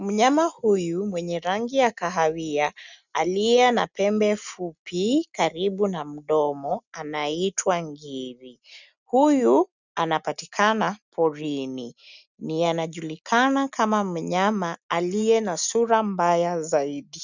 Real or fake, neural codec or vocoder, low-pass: real; none; 7.2 kHz